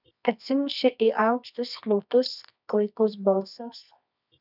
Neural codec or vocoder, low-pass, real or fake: codec, 24 kHz, 0.9 kbps, WavTokenizer, medium music audio release; 5.4 kHz; fake